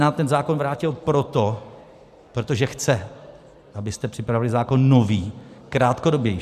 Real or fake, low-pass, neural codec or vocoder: fake; 14.4 kHz; vocoder, 44.1 kHz, 128 mel bands every 512 samples, BigVGAN v2